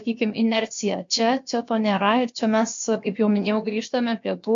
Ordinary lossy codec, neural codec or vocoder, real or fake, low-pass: MP3, 48 kbps; codec, 16 kHz, about 1 kbps, DyCAST, with the encoder's durations; fake; 7.2 kHz